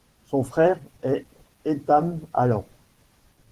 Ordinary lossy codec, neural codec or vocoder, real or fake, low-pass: Opus, 16 kbps; vocoder, 44.1 kHz, 128 mel bands every 512 samples, BigVGAN v2; fake; 14.4 kHz